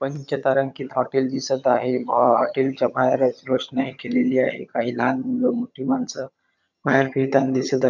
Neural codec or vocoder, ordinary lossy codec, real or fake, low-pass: vocoder, 22.05 kHz, 80 mel bands, HiFi-GAN; none; fake; 7.2 kHz